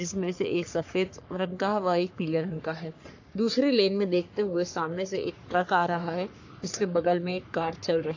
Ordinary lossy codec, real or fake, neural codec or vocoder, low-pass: AAC, 48 kbps; fake; codec, 44.1 kHz, 3.4 kbps, Pupu-Codec; 7.2 kHz